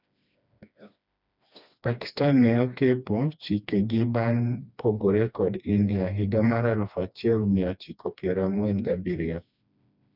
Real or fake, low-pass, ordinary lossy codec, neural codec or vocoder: fake; 5.4 kHz; none; codec, 16 kHz, 2 kbps, FreqCodec, smaller model